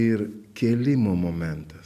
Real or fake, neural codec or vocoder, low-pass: real; none; 14.4 kHz